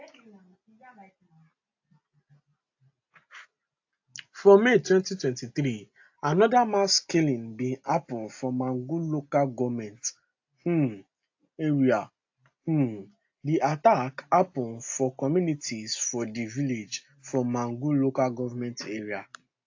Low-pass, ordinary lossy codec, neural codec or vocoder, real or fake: 7.2 kHz; AAC, 48 kbps; none; real